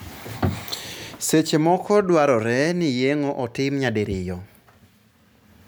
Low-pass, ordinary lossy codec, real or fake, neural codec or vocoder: none; none; real; none